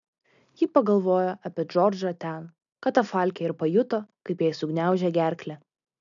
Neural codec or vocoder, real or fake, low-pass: none; real; 7.2 kHz